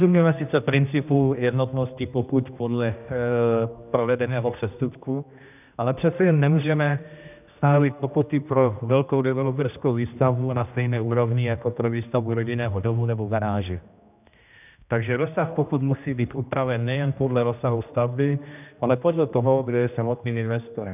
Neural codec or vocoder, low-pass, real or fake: codec, 16 kHz, 1 kbps, X-Codec, HuBERT features, trained on general audio; 3.6 kHz; fake